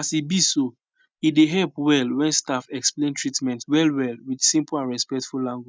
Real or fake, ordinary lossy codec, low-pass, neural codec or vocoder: real; none; none; none